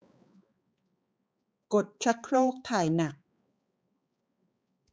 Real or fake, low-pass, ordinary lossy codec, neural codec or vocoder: fake; none; none; codec, 16 kHz, 4 kbps, X-Codec, HuBERT features, trained on balanced general audio